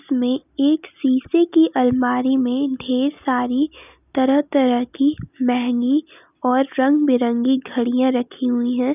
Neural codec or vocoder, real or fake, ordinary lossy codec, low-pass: none; real; none; 3.6 kHz